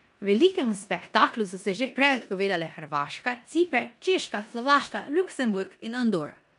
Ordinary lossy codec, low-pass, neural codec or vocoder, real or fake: none; 10.8 kHz; codec, 16 kHz in and 24 kHz out, 0.9 kbps, LongCat-Audio-Codec, four codebook decoder; fake